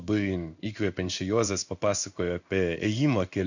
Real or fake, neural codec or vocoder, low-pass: fake; codec, 16 kHz in and 24 kHz out, 1 kbps, XY-Tokenizer; 7.2 kHz